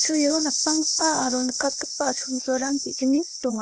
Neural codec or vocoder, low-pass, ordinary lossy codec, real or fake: codec, 16 kHz, 0.8 kbps, ZipCodec; none; none; fake